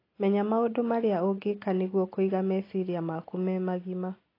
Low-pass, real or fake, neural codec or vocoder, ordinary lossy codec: 5.4 kHz; real; none; AAC, 24 kbps